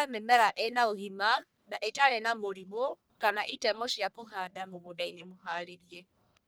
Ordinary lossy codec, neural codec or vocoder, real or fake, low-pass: none; codec, 44.1 kHz, 1.7 kbps, Pupu-Codec; fake; none